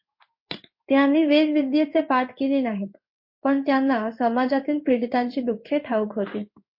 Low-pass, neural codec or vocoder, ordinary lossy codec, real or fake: 5.4 kHz; codec, 16 kHz in and 24 kHz out, 1 kbps, XY-Tokenizer; MP3, 32 kbps; fake